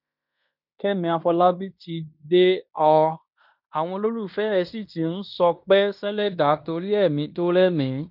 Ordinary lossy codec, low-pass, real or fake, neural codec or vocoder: none; 5.4 kHz; fake; codec, 16 kHz in and 24 kHz out, 0.9 kbps, LongCat-Audio-Codec, fine tuned four codebook decoder